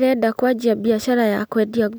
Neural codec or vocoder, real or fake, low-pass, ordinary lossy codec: none; real; none; none